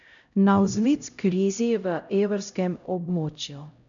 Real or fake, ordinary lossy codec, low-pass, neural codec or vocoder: fake; none; 7.2 kHz; codec, 16 kHz, 0.5 kbps, X-Codec, HuBERT features, trained on LibriSpeech